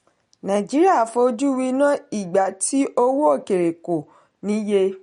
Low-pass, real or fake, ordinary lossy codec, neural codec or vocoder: 19.8 kHz; real; MP3, 48 kbps; none